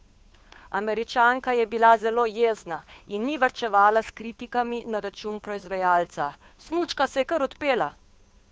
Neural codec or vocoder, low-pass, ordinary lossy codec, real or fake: codec, 16 kHz, 2 kbps, FunCodec, trained on Chinese and English, 25 frames a second; none; none; fake